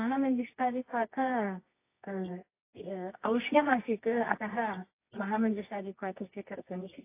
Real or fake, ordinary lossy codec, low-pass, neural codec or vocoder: fake; AAC, 24 kbps; 3.6 kHz; codec, 24 kHz, 0.9 kbps, WavTokenizer, medium music audio release